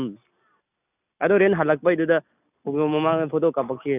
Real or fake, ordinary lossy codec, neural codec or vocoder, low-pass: real; none; none; 3.6 kHz